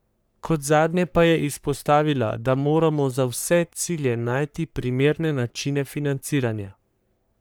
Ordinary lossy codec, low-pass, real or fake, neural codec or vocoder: none; none; fake; codec, 44.1 kHz, 3.4 kbps, Pupu-Codec